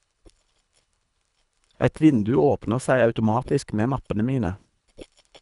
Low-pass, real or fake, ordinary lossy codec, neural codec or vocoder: 10.8 kHz; fake; none; codec, 24 kHz, 3 kbps, HILCodec